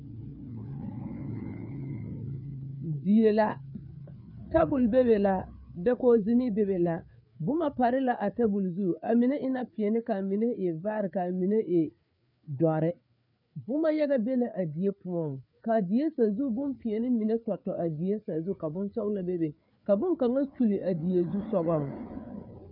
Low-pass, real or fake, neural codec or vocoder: 5.4 kHz; fake; codec, 16 kHz, 4 kbps, FreqCodec, larger model